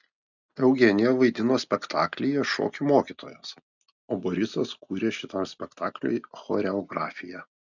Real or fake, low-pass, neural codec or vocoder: real; 7.2 kHz; none